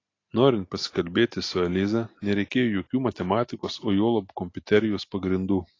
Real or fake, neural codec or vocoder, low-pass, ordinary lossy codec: real; none; 7.2 kHz; AAC, 32 kbps